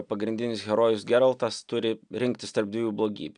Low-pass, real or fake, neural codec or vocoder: 9.9 kHz; real; none